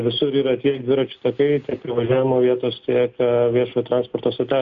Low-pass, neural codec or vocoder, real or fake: 7.2 kHz; none; real